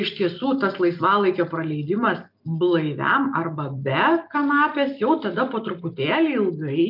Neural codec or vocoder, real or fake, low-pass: none; real; 5.4 kHz